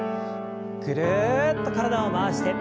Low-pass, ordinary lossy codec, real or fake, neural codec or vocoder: none; none; real; none